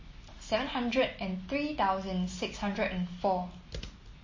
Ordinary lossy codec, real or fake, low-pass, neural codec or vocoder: MP3, 32 kbps; real; 7.2 kHz; none